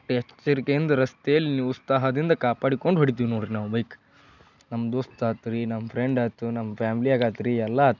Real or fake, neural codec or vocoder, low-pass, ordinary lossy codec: real; none; 7.2 kHz; none